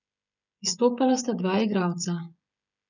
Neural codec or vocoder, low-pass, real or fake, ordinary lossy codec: codec, 16 kHz, 16 kbps, FreqCodec, smaller model; 7.2 kHz; fake; none